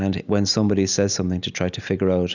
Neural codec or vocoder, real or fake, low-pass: none; real; 7.2 kHz